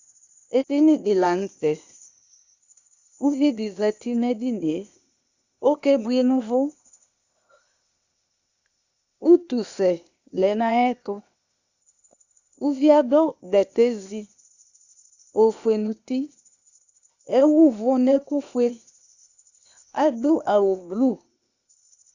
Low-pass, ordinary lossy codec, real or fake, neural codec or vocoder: 7.2 kHz; Opus, 64 kbps; fake; codec, 16 kHz, 0.8 kbps, ZipCodec